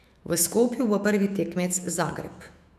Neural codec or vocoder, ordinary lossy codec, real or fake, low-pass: autoencoder, 48 kHz, 128 numbers a frame, DAC-VAE, trained on Japanese speech; none; fake; 14.4 kHz